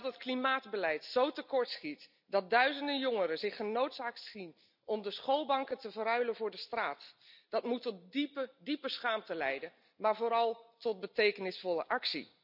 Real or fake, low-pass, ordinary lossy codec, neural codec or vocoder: real; 5.4 kHz; none; none